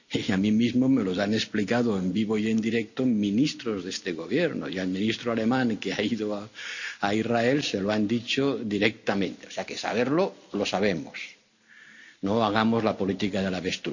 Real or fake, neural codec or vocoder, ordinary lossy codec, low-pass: real; none; none; 7.2 kHz